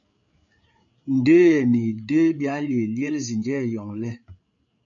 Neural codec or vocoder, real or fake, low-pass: codec, 16 kHz, 8 kbps, FreqCodec, larger model; fake; 7.2 kHz